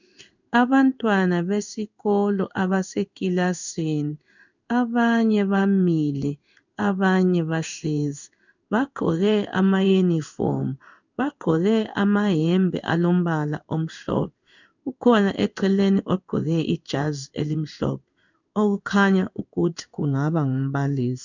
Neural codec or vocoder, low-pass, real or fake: codec, 16 kHz in and 24 kHz out, 1 kbps, XY-Tokenizer; 7.2 kHz; fake